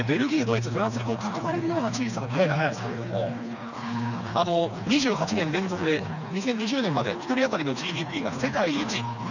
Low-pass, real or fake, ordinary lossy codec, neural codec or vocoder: 7.2 kHz; fake; none; codec, 16 kHz, 2 kbps, FreqCodec, smaller model